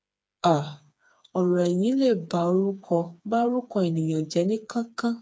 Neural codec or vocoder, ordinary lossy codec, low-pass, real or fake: codec, 16 kHz, 4 kbps, FreqCodec, smaller model; none; none; fake